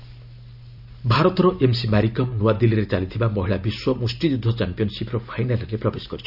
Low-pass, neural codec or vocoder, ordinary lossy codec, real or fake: 5.4 kHz; none; none; real